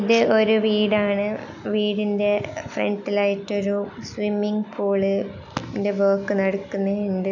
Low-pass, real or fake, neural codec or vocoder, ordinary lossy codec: 7.2 kHz; real; none; none